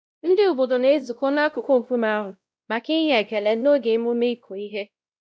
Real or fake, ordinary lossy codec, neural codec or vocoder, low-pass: fake; none; codec, 16 kHz, 0.5 kbps, X-Codec, WavLM features, trained on Multilingual LibriSpeech; none